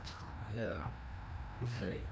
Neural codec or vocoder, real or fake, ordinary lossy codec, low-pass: codec, 16 kHz, 1 kbps, FreqCodec, larger model; fake; none; none